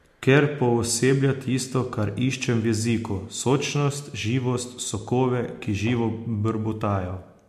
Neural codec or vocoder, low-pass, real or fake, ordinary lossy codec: none; 14.4 kHz; real; MP3, 64 kbps